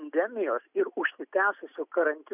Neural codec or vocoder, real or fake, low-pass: none; real; 3.6 kHz